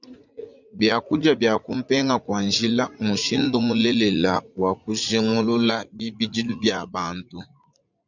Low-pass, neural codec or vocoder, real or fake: 7.2 kHz; vocoder, 44.1 kHz, 80 mel bands, Vocos; fake